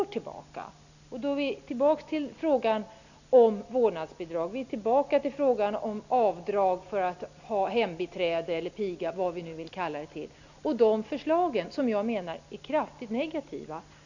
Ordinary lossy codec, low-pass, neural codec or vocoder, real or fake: none; 7.2 kHz; none; real